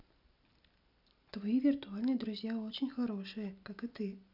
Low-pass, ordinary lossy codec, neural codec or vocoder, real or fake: 5.4 kHz; none; none; real